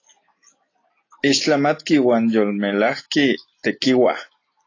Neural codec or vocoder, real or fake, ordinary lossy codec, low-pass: none; real; AAC, 32 kbps; 7.2 kHz